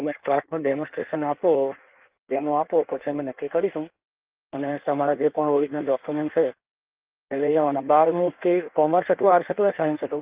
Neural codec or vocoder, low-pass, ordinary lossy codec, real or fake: codec, 16 kHz in and 24 kHz out, 1.1 kbps, FireRedTTS-2 codec; 3.6 kHz; Opus, 24 kbps; fake